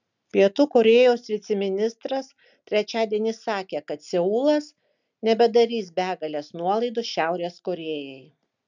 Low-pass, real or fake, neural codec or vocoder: 7.2 kHz; real; none